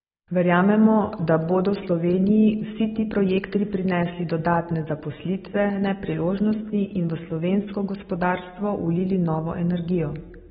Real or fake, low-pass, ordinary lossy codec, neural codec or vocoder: real; 19.8 kHz; AAC, 16 kbps; none